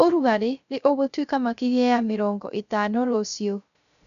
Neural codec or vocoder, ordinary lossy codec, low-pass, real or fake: codec, 16 kHz, 0.3 kbps, FocalCodec; none; 7.2 kHz; fake